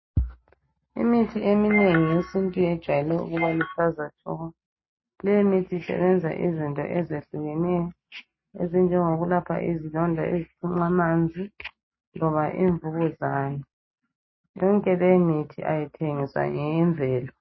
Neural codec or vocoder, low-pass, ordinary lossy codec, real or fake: none; 7.2 kHz; MP3, 24 kbps; real